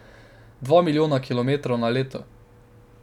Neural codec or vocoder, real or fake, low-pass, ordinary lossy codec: none; real; 19.8 kHz; none